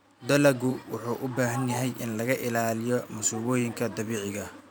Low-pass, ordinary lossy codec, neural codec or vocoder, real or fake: none; none; vocoder, 44.1 kHz, 128 mel bands every 512 samples, BigVGAN v2; fake